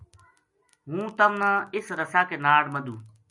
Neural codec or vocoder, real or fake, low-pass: none; real; 10.8 kHz